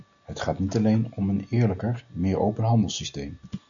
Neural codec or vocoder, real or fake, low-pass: none; real; 7.2 kHz